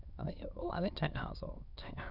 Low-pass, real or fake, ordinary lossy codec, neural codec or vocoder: 5.4 kHz; fake; none; autoencoder, 22.05 kHz, a latent of 192 numbers a frame, VITS, trained on many speakers